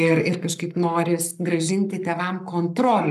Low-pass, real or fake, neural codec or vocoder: 14.4 kHz; fake; codec, 44.1 kHz, 7.8 kbps, Pupu-Codec